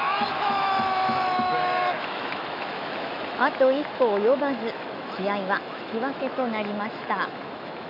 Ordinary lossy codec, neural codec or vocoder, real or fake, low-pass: none; autoencoder, 48 kHz, 128 numbers a frame, DAC-VAE, trained on Japanese speech; fake; 5.4 kHz